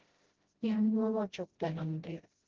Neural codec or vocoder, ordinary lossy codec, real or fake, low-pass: codec, 16 kHz, 0.5 kbps, FreqCodec, smaller model; Opus, 16 kbps; fake; 7.2 kHz